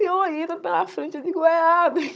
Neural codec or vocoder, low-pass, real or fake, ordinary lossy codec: codec, 16 kHz, 16 kbps, FunCodec, trained on Chinese and English, 50 frames a second; none; fake; none